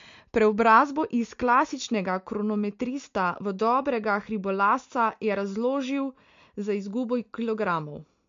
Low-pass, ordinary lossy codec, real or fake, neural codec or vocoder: 7.2 kHz; MP3, 48 kbps; real; none